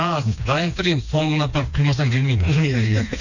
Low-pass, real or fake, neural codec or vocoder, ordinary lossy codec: 7.2 kHz; fake; codec, 16 kHz, 2 kbps, FreqCodec, smaller model; none